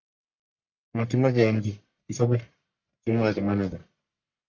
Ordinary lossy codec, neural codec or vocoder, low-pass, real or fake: MP3, 48 kbps; codec, 44.1 kHz, 1.7 kbps, Pupu-Codec; 7.2 kHz; fake